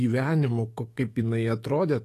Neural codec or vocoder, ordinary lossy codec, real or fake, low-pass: autoencoder, 48 kHz, 128 numbers a frame, DAC-VAE, trained on Japanese speech; AAC, 48 kbps; fake; 14.4 kHz